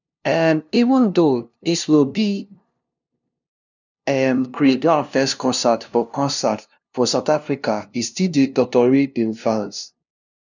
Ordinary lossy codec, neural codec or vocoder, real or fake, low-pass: none; codec, 16 kHz, 0.5 kbps, FunCodec, trained on LibriTTS, 25 frames a second; fake; 7.2 kHz